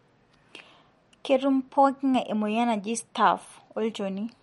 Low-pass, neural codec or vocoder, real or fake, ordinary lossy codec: 19.8 kHz; none; real; MP3, 48 kbps